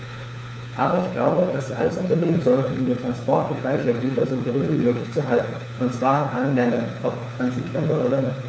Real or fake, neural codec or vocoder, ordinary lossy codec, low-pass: fake; codec, 16 kHz, 4 kbps, FunCodec, trained on LibriTTS, 50 frames a second; none; none